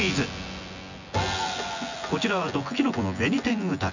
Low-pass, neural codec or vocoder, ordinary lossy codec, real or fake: 7.2 kHz; vocoder, 24 kHz, 100 mel bands, Vocos; none; fake